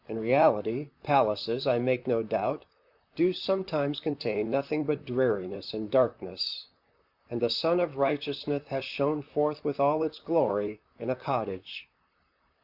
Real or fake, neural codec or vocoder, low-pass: fake; vocoder, 44.1 kHz, 128 mel bands, Pupu-Vocoder; 5.4 kHz